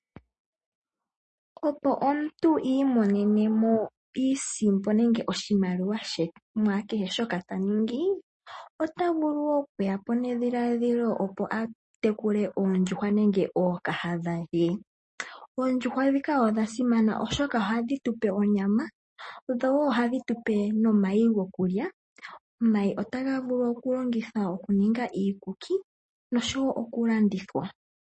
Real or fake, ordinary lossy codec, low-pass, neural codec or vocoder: real; MP3, 32 kbps; 9.9 kHz; none